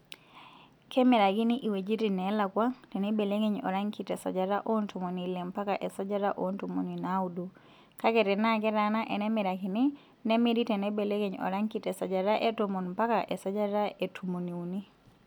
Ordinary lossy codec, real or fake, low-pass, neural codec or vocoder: none; real; 19.8 kHz; none